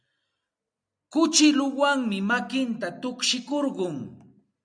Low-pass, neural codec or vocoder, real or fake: 9.9 kHz; none; real